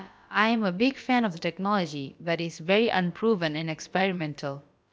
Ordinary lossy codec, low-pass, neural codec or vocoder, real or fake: none; none; codec, 16 kHz, about 1 kbps, DyCAST, with the encoder's durations; fake